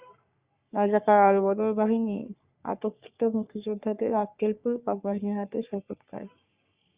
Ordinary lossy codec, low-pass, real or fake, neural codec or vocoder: Opus, 64 kbps; 3.6 kHz; fake; codec, 44.1 kHz, 3.4 kbps, Pupu-Codec